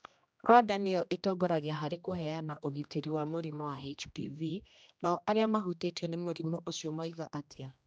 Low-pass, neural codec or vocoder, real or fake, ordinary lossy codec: none; codec, 16 kHz, 1 kbps, X-Codec, HuBERT features, trained on general audio; fake; none